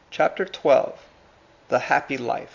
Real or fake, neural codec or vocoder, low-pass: real; none; 7.2 kHz